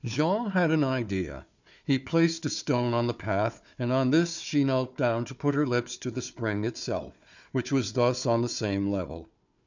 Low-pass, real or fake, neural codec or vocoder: 7.2 kHz; fake; codec, 16 kHz, 4 kbps, FunCodec, trained on Chinese and English, 50 frames a second